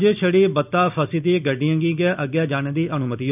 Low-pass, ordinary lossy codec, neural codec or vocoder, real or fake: 3.6 kHz; none; none; real